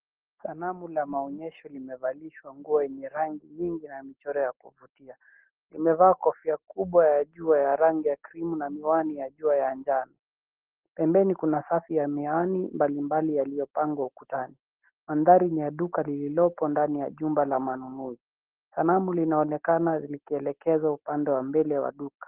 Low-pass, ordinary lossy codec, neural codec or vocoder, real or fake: 3.6 kHz; Opus, 16 kbps; none; real